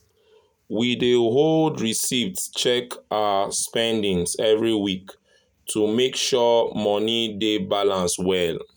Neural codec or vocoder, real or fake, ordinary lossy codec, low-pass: none; real; none; none